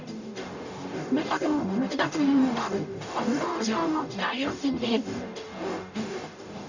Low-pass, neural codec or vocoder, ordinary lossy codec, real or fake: 7.2 kHz; codec, 44.1 kHz, 0.9 kbps, DAC; none; fake